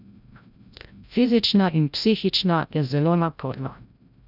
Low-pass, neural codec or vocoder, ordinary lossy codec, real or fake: 5.4 kHz; codec, 16 kHz, 0.5 kbps, FreqCodec, larger model; none; fake